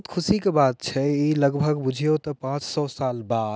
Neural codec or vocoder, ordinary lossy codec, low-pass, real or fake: none; none; none; real